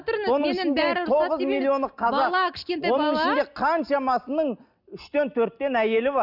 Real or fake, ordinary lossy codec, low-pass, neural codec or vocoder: real; none; 5.4 kHz; none